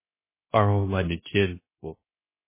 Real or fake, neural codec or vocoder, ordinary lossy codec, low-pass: fake; codec, 16 kHz, 0.3 kbps, FocalCodec; MP3, 16 kbps; 3.6 kHz